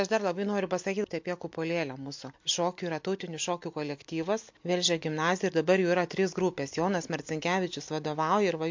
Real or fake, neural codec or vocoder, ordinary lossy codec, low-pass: real; none; MP3, 48 kbps; 7.2 kHz